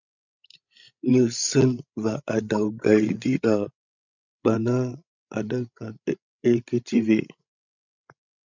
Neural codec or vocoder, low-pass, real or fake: codec, 16 kHz, 16 kbps, FreqCodec, larger model; 7.2 kHz; fake